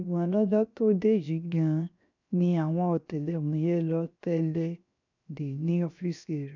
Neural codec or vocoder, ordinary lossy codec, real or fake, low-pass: codec, 16 kHz, 0.7 kbps, FocalCodec; none; fake; 7.2 kHz